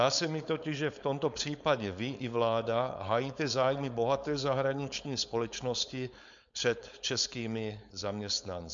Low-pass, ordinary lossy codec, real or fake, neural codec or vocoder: 7.2 kHz; MP3, 64 kbps; fake; codec, 16 kHz, 4.8 kbps, FACodec